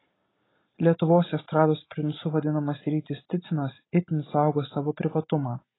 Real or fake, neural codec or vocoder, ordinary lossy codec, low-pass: real; none; AAC, 16 kbps; 7.2 kHz